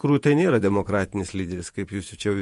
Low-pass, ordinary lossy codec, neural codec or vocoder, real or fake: 14.4 kHz; MP3, 48 kbps; vocoder, 48 kHz, 128 mel bands, Vocos; fake